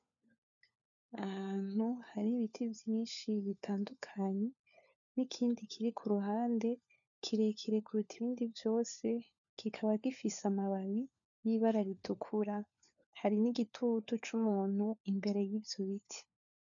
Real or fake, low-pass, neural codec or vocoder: fake; 7.2 kHz; codec, 16 kHz, 4 kbps, FunCodec, trained on LibriTTS, 50 frames a second